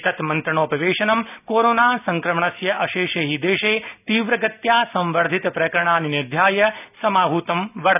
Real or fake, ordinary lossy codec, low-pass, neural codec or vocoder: real; none; 3.6 kHz; none